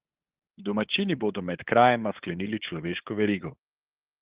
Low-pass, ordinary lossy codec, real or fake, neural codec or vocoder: 3.6 kHz; Opus, 16 kbps; fake; codec, 16 kHz, 8 kbps, FunCodec, trained on LibriTTS, 25 frames a second